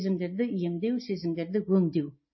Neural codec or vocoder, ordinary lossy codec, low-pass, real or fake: none; MP3, 24 kbps; 7.2 kHz; real